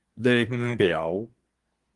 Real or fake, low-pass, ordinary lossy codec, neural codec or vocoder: fake; 10.8 kHz; Opus, 24 kbps; codec, 24 kHz, 1 kbps, SNAC